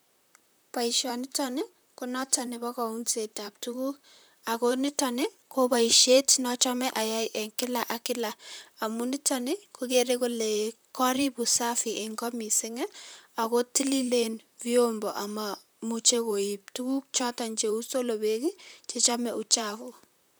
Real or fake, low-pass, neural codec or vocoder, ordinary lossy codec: fake; none; vocoder, 44.1 kHz, 128 mel bands, Pupu-Vocoder; none